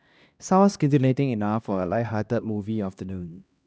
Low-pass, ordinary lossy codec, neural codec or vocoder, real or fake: none; none; codec, 16 kHz, 1 kbps, X-Codec, HuBERT features, trained on LibriSpeech; fake